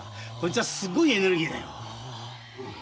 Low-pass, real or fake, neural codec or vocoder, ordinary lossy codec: none; real; none; none